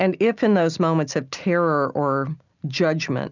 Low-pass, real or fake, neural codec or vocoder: 7.2 kHz; real; none